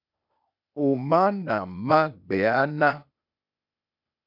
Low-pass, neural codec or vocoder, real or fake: 5.4 kHz; codec, 16 kHz, 0.8 kbps, ZipCodec; fake